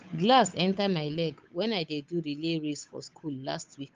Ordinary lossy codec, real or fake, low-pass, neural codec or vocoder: Opus, 24 kbps; fake; 7.2 kHz; codec, 16 kHz, 8 kbps, FunCodec, trained on Chinese and English, 25 frames a second